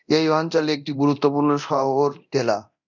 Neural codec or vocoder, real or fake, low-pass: codec, 24 kHz, 0.9 kbps, DualCodec; fake; 7.2 kHz